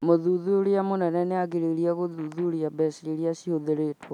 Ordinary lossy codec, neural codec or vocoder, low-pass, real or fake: none; none; 19.8 kHz; real